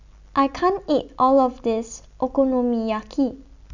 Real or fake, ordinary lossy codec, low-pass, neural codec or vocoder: real; none; 7.2 kHz; none